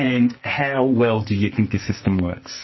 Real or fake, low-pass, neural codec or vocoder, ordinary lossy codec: fake; 7.2 kHz; codec, 44.1 kHz, 2.6 kbps, SNAC; MP3, 24 kbps